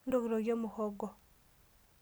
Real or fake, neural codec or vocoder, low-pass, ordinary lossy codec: real; none; none; none